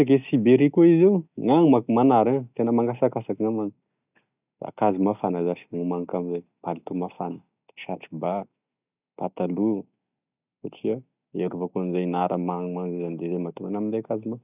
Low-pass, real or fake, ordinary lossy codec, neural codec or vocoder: 3.6 kHz; real; none; none